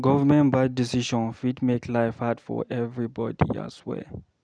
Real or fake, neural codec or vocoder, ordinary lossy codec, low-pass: real; none; none; 9.9 kHz